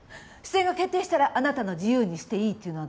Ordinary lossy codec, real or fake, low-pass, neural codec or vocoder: none; real; none; none